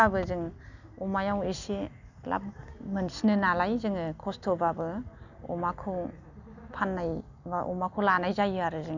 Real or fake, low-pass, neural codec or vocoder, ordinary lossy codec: real; 7.2 kHz; none; none